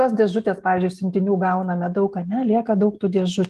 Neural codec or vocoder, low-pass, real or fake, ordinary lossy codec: none; 14.4 kHz; real; Opus, 24 kbps